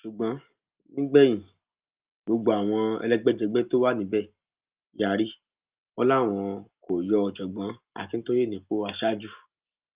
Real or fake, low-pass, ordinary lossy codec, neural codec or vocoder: real; 3.6 kHz; Opus, 24 kbps; none